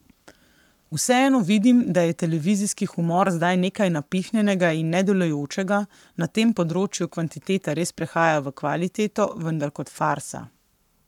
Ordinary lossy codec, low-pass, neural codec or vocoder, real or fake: none; 19.8 kHz; codec, 44.1 kHz, 7.8 kbps, Pupu-Codec; fake